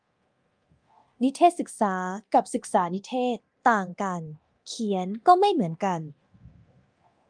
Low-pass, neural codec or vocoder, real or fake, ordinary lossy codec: 9.9 kHz; codec, 24 kHz, 0.9 kbps, DualCodec; fake; Opus, 32 kbps